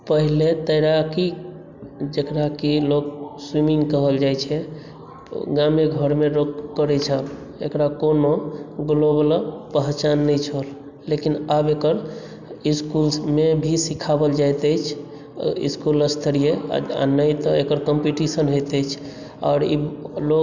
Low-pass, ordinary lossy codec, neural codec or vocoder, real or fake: 7.2 kHz; none; none; real